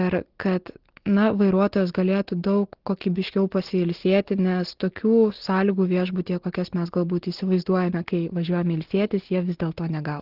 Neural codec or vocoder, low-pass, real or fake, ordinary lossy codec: none; 5.4 kHz; real; Opus, 16 kbps